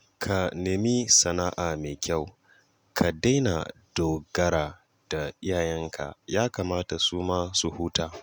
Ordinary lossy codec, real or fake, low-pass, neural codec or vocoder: none; real; none; none